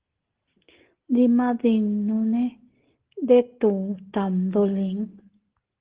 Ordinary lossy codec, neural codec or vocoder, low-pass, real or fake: Opus, 16 kbps; none; 3.6 kHz; real